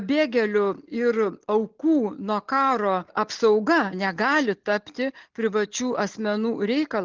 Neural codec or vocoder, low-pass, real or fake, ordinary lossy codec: none; 7.2 kHz; real; Opus, 32 kbps